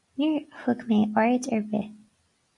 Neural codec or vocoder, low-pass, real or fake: none; 10.8 kHz; real